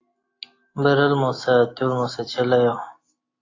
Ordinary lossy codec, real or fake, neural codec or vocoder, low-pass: AAC, 32 kbps; real; none; 7.2 kHz